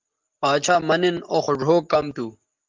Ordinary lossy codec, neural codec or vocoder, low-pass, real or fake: Opus, 32 kbps; none; 7.2 kHz; real